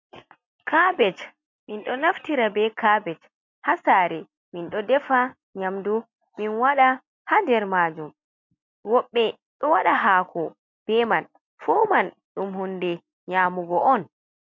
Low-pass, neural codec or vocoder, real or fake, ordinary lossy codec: 7.2 kHz; none; real; MP3, 48 kbps